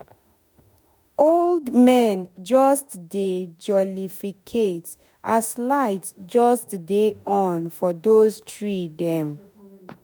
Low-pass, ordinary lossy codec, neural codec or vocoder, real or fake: none; none; autoencoder, 48 kHz, 32 numbers a frame, DAC-VAE, trained on Japanese speech; fake